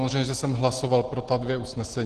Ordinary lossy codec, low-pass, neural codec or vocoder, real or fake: Opus, 16 kbps; 9.9 kHz; none; real